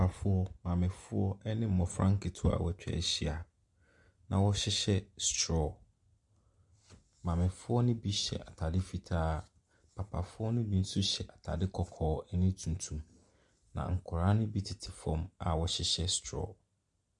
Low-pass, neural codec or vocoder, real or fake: 10.8 kHz; none; real